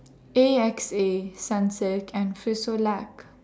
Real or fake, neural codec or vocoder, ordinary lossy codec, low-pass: real; none; none; none